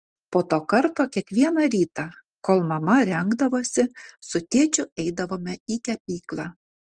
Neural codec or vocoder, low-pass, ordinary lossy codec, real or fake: none; 9.9 kHz; Opus, 24 kbps; real